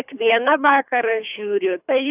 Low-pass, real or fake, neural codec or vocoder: 3.6 kHz; fake; codec, 24 kHz, 3 kbps, HILCodec